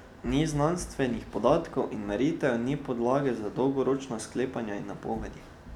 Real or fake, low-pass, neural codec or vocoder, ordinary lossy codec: real; 19.8 kHz; none; none